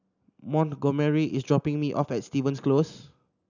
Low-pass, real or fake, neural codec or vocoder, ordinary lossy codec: 7.2 kHz; real; none; none